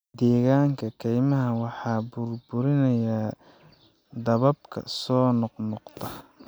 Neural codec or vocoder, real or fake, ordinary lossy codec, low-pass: none; real; none; none